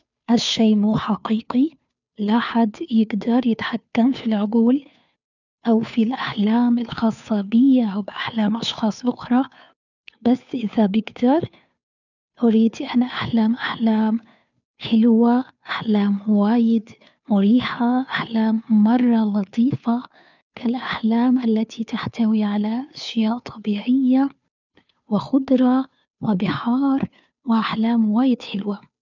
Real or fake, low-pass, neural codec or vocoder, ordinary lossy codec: fake; 7.2 kHz; codec, 16 kHz, 2 kbps, FunCodec, trained on Chinese and English, 25 frames a second; none